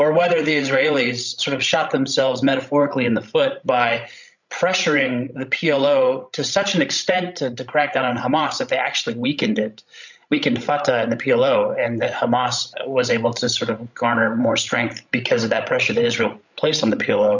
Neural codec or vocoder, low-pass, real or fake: codec, 16 kHz, 16 kbps, FreqCodec, larger model; 7.2 kHz; fake